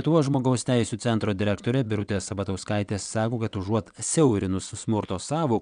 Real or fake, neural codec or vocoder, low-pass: fake; vocoder, 22.05 kHz, 80 mel bands, WaveNeXt; 9.9 kHz